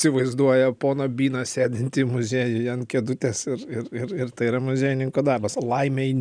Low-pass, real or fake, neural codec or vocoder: 9.9 kHz; real; none